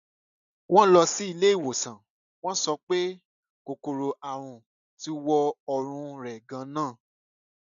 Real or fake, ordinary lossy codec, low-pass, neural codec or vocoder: real; none; 7.2 kHz; none